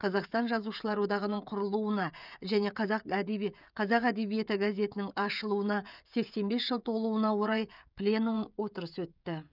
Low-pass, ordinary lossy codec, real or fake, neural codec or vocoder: 5.4 kHz; none; fake; codec, 16 kHz, 16 kbps, FreqCodec, smaller model